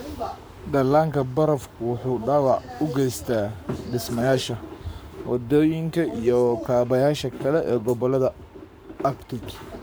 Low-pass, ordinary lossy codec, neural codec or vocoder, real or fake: none; none; codec, 44.1 kHz, 7.8 kbps, Pupu-Codec; fake